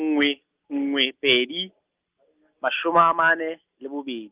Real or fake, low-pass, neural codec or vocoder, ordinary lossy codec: real; 3.6 kHz; none; Opus, 24 kbps